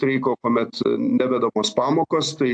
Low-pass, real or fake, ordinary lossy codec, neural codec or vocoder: 9.9 kHz; real; AAC, 64 kbps; none